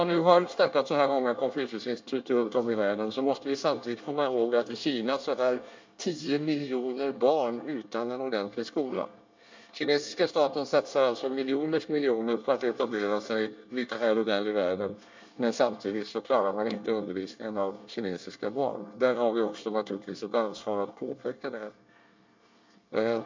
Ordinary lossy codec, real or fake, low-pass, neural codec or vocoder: MP3, 64 kbps; fake; 7.2 kHz; codec, 24 kHz, 1 kbps, SNAC